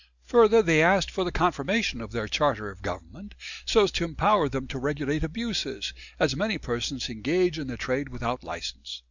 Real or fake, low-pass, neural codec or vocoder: real; 7.2 kHz; none